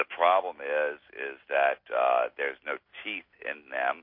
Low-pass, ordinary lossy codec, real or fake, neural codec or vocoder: 5.4 kHz; MP3, 32 kbps; real; none